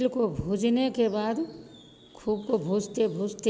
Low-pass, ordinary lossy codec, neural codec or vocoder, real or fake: none; none; none; real